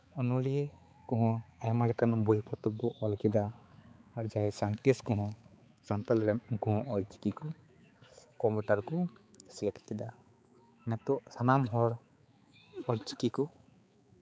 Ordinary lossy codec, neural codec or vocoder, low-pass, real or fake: none; codec, 16 kHz, 4 kbps, X-Codec, HuBERT features, trained on balanced general audio; none; fake